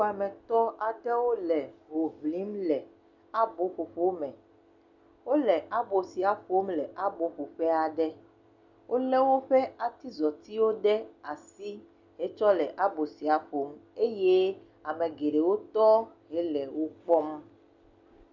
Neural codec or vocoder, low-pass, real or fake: none; 7.2 kHz; real